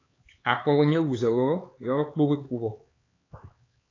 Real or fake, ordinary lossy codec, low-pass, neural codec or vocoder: fake; AAC, 32 kbps; 7.2 kHz; codec, 16 kHz, 4 kbps, X-Codec, HuBERT features, trained on LibriSpeech